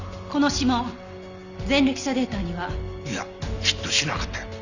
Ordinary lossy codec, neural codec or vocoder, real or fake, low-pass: none; none; real; 7.2 kHz